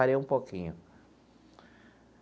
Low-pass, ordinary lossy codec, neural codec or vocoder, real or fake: none; none; none; real